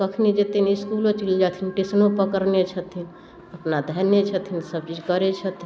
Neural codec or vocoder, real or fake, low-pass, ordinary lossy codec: none; real; none; none